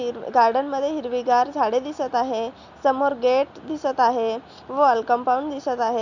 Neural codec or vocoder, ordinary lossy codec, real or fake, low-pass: none; none; real; 7.2 kHz